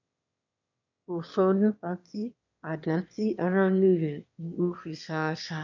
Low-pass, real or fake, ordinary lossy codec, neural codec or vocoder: 7.2 kHz; fake; none; autoencoder, 22.05 kHz, a latent of 192 numbers a frame, VITS, trained on one speaker